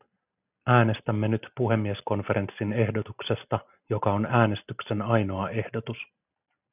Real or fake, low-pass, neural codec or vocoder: real; 3.6 kHz; none